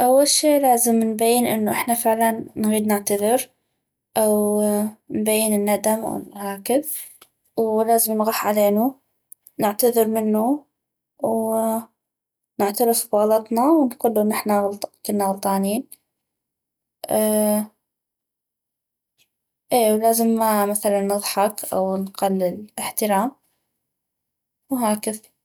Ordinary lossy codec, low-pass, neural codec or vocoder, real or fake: none; none; none; real